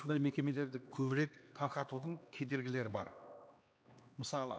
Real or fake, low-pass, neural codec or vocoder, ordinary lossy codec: fake; none; codec, 16 kHz, 2 kbps, X-Codec, HuBERT features, trained on LibriSpeech; none